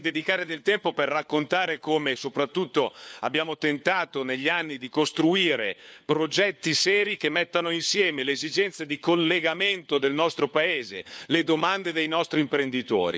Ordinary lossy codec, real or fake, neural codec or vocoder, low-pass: none; fake; codec, 16 kHz, 4 kbps, FunCodec, trained on LibriTTS, 50 frames a second; none